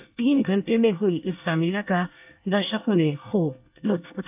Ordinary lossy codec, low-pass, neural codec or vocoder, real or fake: AAC, 32 kbps; 3.6 kHz; codec, 24 kHz, 1 kbps, SNAC; fake